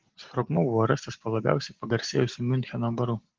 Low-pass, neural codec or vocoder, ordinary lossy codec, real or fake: 7.2 kHz; none; Opus, 16 kbps; real